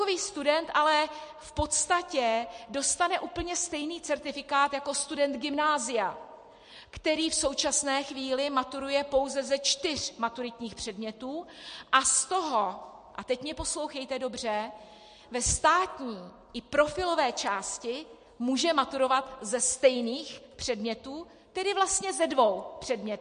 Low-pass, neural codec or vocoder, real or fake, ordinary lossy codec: 9.9 kHz; none; real; MP3, 48 kbps